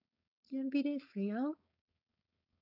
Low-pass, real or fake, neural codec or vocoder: 5.4 kHz; fake; codec, 16 kHz, 4.8 kbps, FACodec